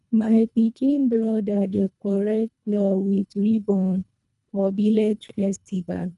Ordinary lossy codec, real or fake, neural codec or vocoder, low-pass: none; fake; codec, 24 kHz, 1.5 kbps, HILCodec; 10.8 kHz